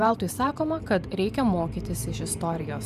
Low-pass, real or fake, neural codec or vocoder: 14.4 kHz; fake; vocoder, 44.1 kHz, 128 mel bands every 512 samples, BigVGAN v2